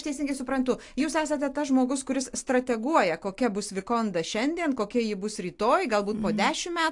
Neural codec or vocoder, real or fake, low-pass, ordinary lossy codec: none; real; 10.8 kHz; AAC, 64 kbps